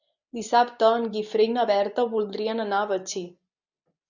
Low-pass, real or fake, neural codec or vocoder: 7.2 kHz; real; none